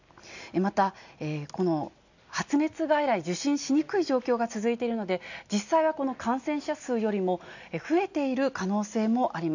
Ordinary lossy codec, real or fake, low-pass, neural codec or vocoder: none; real; 7.2 kHz; none